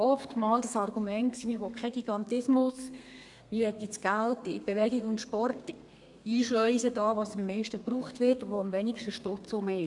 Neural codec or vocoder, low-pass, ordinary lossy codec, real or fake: codec, 32 kHz, 1.9 kbps, SNAC; 10.8 kHz; none; fake